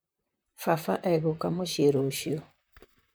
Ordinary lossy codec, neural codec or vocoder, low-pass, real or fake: none; vocoder, 44.1 kHz, 128 mel bands, Pupu-Vocoder; none; fake